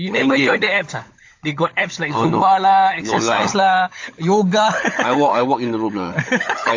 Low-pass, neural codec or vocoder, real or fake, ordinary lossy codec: 7.2 kHz; codec, 16 kHz, 16 kbps, FunCodec, trained on LibriTTS, 50 frames a second; fake; AAC, 48 kbps